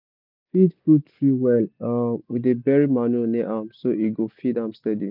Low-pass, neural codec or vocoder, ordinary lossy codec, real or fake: 5.4 kHz; none; none; real